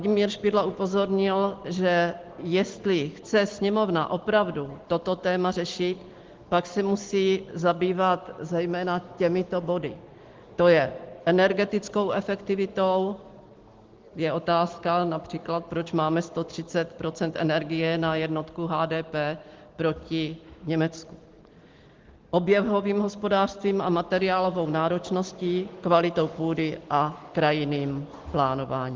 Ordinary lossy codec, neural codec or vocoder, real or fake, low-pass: Opus, 16 kbps; none; real; 7.2 kHz